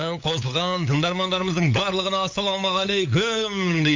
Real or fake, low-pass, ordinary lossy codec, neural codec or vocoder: fake; 7.2 kHz; MP3, 48 kbps; codec, 16 kHz, 8 kbps, FunCodec, trained on LibriTTS, 25 frames a second